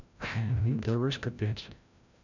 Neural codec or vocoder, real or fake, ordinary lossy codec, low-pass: codec, 16 kHz, 0.5 kbps, FreqCodec, larger model; fake; none; 7.2 kHz